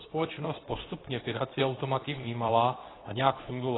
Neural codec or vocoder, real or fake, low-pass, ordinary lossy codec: codec, 24 kHz, 0.9 kbps, WavTokenizer, medium speech release version 2; fake; 7.2 kHz; AAC, 16 kbps